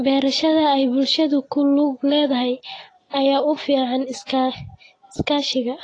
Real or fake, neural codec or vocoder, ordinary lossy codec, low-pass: real; none; AAC, 32 kbps; 9.9 kHz